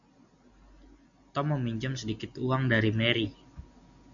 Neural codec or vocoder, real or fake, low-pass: none; real; 7.2 kHz